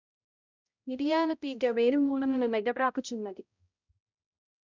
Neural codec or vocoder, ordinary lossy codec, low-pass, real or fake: codec, 16 kHz, 0.5 kbps, X-Codec, HuBERT features, trained on balanced general audio; none; 7.2 kHz; fake